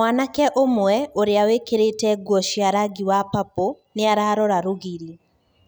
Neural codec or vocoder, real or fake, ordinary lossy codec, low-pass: none; real; none; none